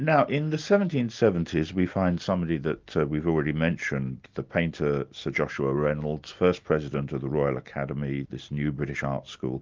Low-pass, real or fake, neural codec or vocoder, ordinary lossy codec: 7.2 kHz; real; none; Opus, 24 kbps